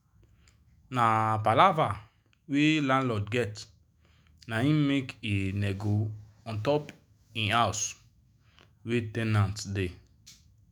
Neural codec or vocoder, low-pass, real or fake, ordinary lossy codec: autoencoder, 48 kHz, 128 numbers a frame, DAC-VAE, trained on Japanese speech; none; fake; none